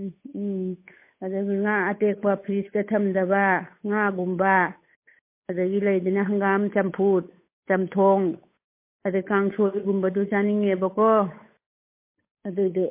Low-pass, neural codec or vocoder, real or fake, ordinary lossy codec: 3.6 kHz; codec, 16 kHz, 8 kbps, FunCodec, trained on Chinese and English, 25 frames a second; fake; MP3, 24 kbps